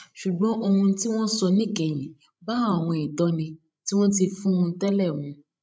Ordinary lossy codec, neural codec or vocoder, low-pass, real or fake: none; codec, 16 kHz, 16 kbps, FreqCodec, larger model; none; fake